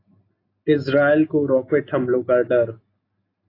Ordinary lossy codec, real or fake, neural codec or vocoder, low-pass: AAC, 32 kbps; real; none; 5.4 kHz